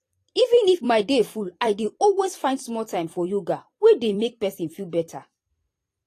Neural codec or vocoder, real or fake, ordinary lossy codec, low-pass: vocoder, 44.1 kHz, 128 mel bands every 256 samples, BigVGAN v2; fake; AAC, 48 kbps; 14.4 kHz